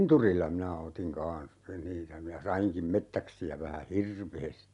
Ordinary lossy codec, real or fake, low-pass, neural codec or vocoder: none; real; 10.8 kHz; none